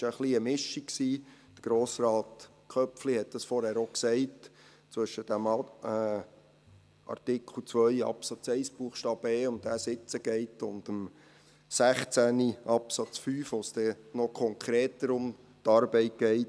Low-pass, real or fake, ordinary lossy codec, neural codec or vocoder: none; real; none; none